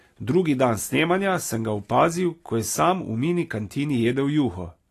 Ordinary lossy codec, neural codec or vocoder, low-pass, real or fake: AAC, 48 kbps; none; 14.4 kHz; real